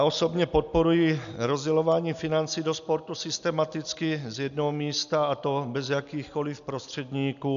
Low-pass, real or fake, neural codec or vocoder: 7.2 kHz; real; none